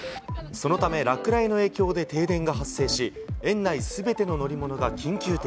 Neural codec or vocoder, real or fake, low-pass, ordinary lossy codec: none; real; none; none